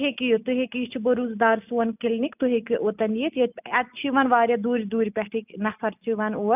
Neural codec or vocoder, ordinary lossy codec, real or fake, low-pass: none; none; real; 3.6 kHz